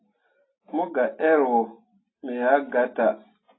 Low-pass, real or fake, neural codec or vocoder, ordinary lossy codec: 7.2 kHz; real; none; AAC, 16 kbps